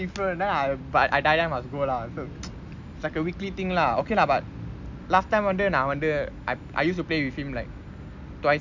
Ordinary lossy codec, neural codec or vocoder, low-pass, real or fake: none; none; 7.2 kHz; real